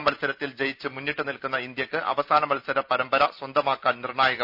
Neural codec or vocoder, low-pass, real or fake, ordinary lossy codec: none; 5.4 kHz; real; none